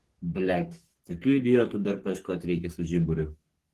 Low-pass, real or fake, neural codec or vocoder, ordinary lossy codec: 14.4 kHz; fake; codec, 44.1 kHz, 3.4 kbps, Pupu-Codec; Opus, 16 kbps